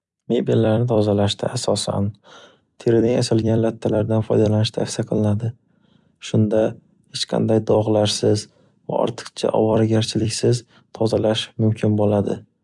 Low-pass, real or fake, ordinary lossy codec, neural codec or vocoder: 10.8 kHz; fake; none; vocoder, 44.1 kHz, 128 mel bands every 256 samples, BigVGAN v2